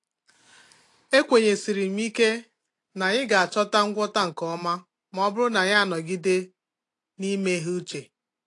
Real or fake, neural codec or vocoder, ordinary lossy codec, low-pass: real; none; AAC, 48 kbps; 10.8 kHz